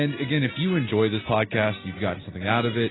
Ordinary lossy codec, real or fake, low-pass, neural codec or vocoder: AAC, 16 kbps; real; 7.2 kHz; none